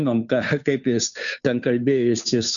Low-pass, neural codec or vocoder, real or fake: 7.2 kHz; codec, 16 kHz, 2 kbps, FunCodec, trained on Chinese and English, 25 frames a second; fake